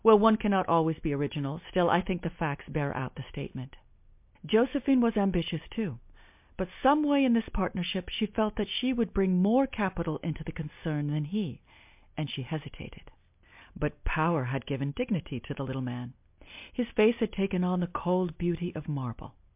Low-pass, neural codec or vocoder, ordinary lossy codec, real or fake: 3.6 kHz; none; MP3, 32 kbps; real